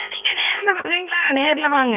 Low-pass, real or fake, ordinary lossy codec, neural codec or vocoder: 3.6 kHz; fake; none; codec, 16 kHz, about 1 kbps, DyCAST, with the encoder's durations